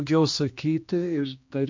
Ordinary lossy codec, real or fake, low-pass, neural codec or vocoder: MP3, 48 kbps; fake; 7.2 kHz; codec, 16 kHz, 2 kbps, X-Codec, HuBERT features, trained on general audio